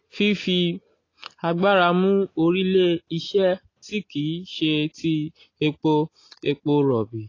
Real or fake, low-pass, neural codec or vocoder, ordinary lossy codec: real; 7.2 kHz; none; AAC, 32 kbps